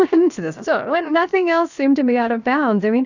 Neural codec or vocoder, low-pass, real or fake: codec, 16 kHz, 0.7 kbps, FocalCodec; 7.2 kHz; fake